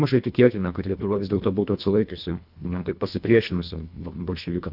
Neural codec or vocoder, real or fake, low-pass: codec, 24 kHz, 1.5 kbps, HILCodec; fake; 5.4 kHz